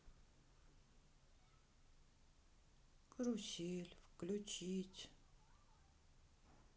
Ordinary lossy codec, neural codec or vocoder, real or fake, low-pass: none; none; real; none